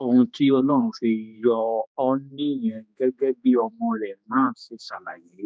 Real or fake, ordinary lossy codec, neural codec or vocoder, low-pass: fake; none; codec, 16 kHz, 2 kbps, X-Codec, HuBERT features, trained on general audio; none